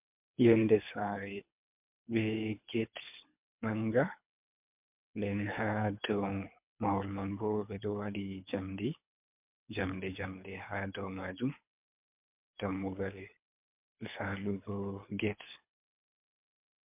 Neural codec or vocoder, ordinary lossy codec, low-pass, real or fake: codec, 24 kHz, 3 kbps, HILCodec; MP3, 32 kbps; 3.6 kHz; fake